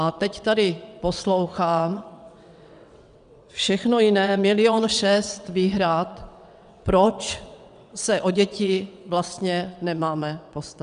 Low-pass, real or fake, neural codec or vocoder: 9.9 kHz; fake; vocoder, 22.05 kHz, 80 mel bands, WaveNeXt